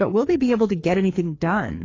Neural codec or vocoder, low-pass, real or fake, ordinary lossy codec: codec, 16 kHz, 2 kbps, FreqCodec, larger model; 7.2 kHz; fake; AAC, 32 kbps